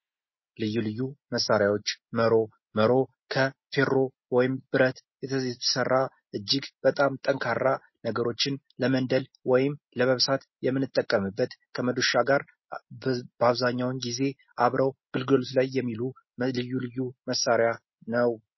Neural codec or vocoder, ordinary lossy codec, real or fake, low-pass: none; MP3, 24 kbps; real; 7.2 kHz